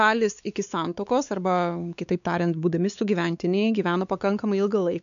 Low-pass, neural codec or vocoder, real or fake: 7.2 kHz; codec, 16 kHz, 4 kbps, X-Codec, WavLM features, trained on Multilingual LibriSpeech; fake